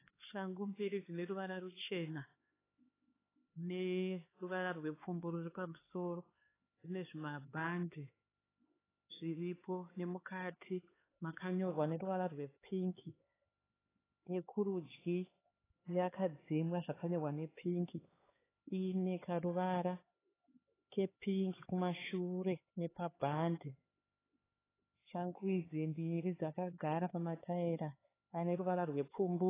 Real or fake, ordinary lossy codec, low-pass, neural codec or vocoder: fake; AAC, 16 kbps; 3.6 kHz; codec, 16 kHz, 4 kbps, X-Codec, HuBERT features, trained on LibriSpeech